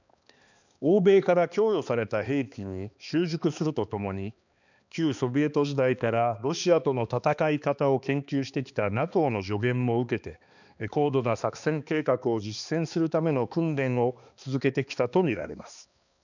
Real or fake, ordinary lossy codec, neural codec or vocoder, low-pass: fake; none; codec, 16 kHz, 2 kbps, X-Codec, HuBERT features, trained on balanced general audio; 7.2 kHz